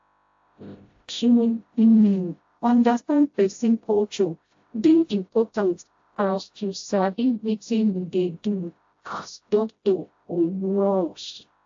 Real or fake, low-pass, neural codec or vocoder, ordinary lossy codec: fake; 7.2 kHz; codec, 16 kHz, 0.5 kbps, FreqCodec, smaller model; AAC, 48 kbps